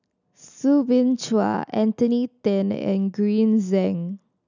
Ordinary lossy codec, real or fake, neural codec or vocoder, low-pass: none; real; none; 7.2 kHz